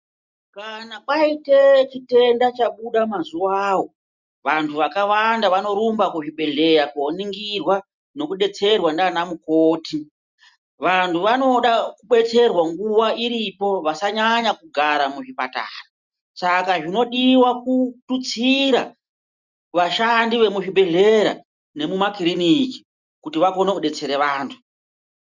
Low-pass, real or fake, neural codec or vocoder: 7.2 kHz; real; none